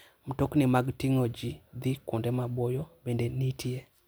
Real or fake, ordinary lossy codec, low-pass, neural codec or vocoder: real; none; none; none